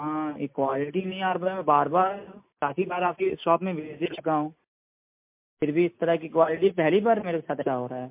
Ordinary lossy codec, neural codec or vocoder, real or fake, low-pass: MP3, 32 kbps; none; real; 3.6 kHz